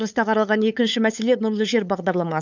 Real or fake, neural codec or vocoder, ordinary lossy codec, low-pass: fake; codec, 16 kHz, 8 kbps, FunCodec, trained on LibriTTS, 25 frames a second; none; 7.2 kHz